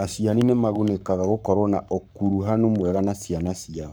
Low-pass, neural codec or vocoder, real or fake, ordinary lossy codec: none; codec, 44.1 kHz, 7.8 kbps, Pupu-Codec; fake; none